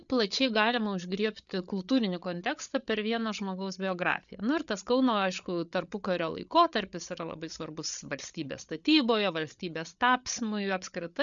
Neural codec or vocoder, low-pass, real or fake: codec, 16 kHz, 8 kbps, FreqCodec, larger model; 7.2 kHz; fake